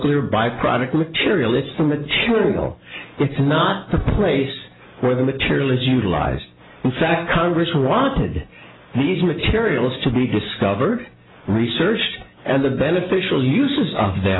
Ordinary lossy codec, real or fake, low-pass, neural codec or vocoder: AAC, 16 kbps; real; 7.2 kHz; none